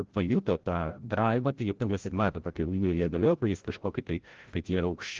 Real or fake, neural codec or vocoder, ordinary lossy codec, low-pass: fake; codec, 16 kHz, 0.5 kbps, FreqCodec, larger model; Opus, 16 kbps; 7.2 kHz